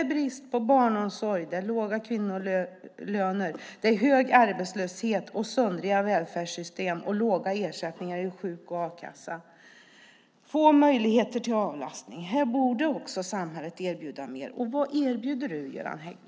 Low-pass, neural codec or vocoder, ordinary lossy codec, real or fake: none; none; none; real